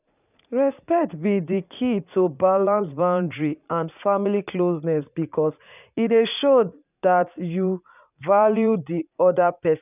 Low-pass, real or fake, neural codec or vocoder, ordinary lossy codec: 3.6 kHz; fake; vocoder, 44.1 kHz, 80 mel bands, Vocos; none